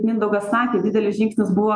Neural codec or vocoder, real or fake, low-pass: none; real; 9.9 kHz